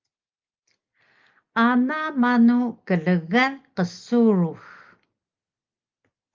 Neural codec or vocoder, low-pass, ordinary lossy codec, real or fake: none; 7.2 kHz; Opus, 24 kbps; real